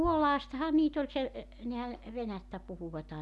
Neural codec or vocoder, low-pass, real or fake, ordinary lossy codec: none; none; real; none